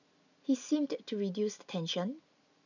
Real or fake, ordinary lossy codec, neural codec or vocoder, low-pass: real; none; none; 7.2 kHz